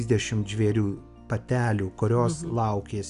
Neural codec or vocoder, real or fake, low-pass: none; real; 10.8 kHz